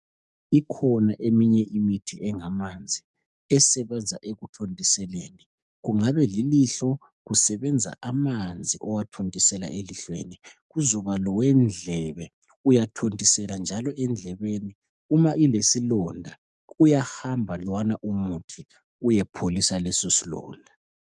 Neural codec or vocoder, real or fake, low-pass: codec, 44.1 kHz, 7.8 kbps, DAC; fake; 10.8 kHz